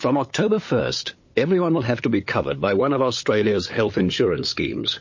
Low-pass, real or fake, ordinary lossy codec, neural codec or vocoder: 7.2 kHz; fake; MP3, 32 kbps; codec, 16 kHz, 8 kbps, FunCodec, trained on LibriTTS, 25 frames a second